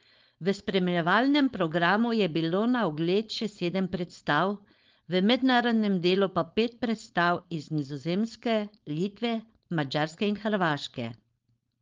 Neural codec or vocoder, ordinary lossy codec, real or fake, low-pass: codec, 16 kHz, 4.8 kbps, FACodec; Opus, 32 kbps; fake; 7.2 kHz